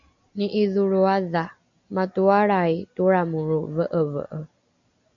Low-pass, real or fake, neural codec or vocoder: 7.2 kHz; real; none